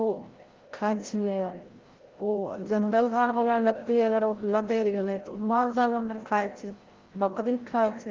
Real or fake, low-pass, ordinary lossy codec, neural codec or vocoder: fake; 7.2 kHz; Opus, 16 kbps; codec, 16 kHz, 0.5 kbps, FreqCodec, larger model